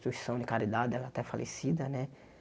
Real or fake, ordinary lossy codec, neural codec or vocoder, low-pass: real; none; none; none